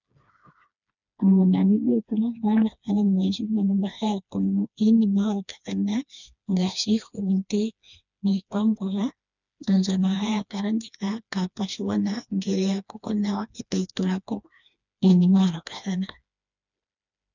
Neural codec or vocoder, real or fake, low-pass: codec, 16 kHz, 2 kbps, FreqCodec, smaller model; fake; 7.2 kHz